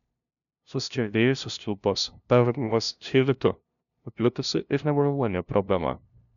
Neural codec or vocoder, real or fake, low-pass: codec, 16 kHz, 0.5 kbps, FunCodec, trained on LibriTTS, 25 frames a second; fake; 7.2 kHz